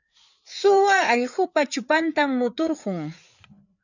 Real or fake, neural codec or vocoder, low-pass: fake; codec, 16 kHz, 4 kbps, FreqCodec, larger model; 7.2 kHz